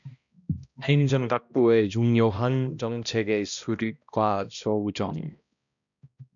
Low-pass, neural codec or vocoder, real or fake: 7.2 kHz; codec, 16 kHz, 1 kbps, X-Codec, HuBERT features, trained on balanced general audio; fake